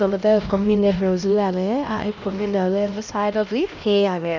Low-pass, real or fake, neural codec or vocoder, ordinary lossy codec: 7.2 kHz; fake; codec, 16 kHz, 1 kbps, X-Codec, HuBERT features, trained on LibriSpeech; none